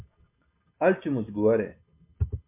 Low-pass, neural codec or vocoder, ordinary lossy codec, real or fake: 3.6 kHz; none; MP3, 24 kbps; real